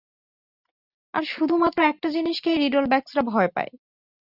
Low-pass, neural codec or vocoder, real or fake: 5.4 kHz; none; real